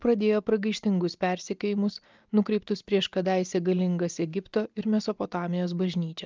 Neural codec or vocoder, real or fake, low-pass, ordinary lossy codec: none; real; 7.2 kHz; Opus, 24 kbps